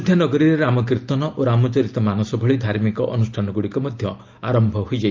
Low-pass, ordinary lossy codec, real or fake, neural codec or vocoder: 7.2 kHz; Opus, 32 kbps; real; none